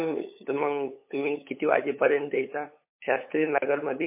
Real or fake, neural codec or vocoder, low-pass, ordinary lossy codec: fake; codec, 16 kHz, 8 kbps, FunCodec, trained on LibriTTS, 25 frames a second; 3.6 kHz; MP3, 24 kbps